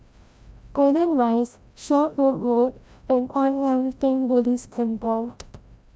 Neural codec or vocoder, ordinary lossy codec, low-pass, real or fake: codec, 16 kHz, 0.5 kbps, FreqCodec, larger model; none; none; fake